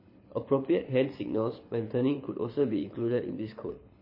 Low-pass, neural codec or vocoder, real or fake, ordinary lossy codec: 5.4 kHz; codec, 24 kHz, 6 kbps, HILCodec; fake; MP3, 24 kbps